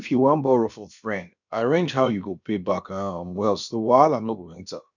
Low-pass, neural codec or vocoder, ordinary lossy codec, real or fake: 7.2 kHz; codec, 16 kHz, about 1 kbps, DyCAST, with the encoder's durations; none; fake